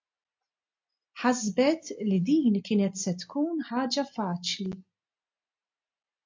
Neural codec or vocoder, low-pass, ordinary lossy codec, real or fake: none; 7.2 kHz; MP3, 48 kbps; real